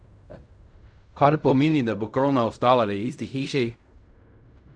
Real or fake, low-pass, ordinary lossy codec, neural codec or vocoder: fake; 9.9 kHz; none; codec, 16 kHz in and 24 kHz out, 0.4 kbps, LongCat-Audio-Codec, fine tuned four codebook decoder